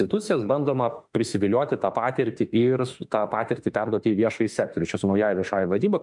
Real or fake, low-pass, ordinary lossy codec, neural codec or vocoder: fake; 10.8 kHz; MP3, 96 kbps; autoencoder, 48 kHz, 32 numbers a frame, DAC-VAE, trained on Japanese speech